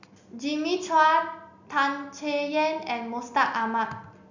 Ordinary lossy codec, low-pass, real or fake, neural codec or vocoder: none; 7.2 kHz; real; none